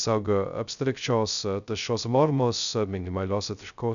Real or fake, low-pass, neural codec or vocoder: fake; 7.2 kHz; codec, 16 kHz, 0.2 kbps, FocalCodec